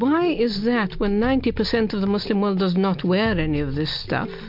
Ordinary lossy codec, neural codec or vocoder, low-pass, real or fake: MP3, 48 kbps; none; 5.4 kHz; real